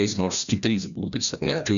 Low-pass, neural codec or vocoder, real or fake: 7.2 kHz; codec, 16 kHz, 1 kbps, FreqCodec, larger model; fake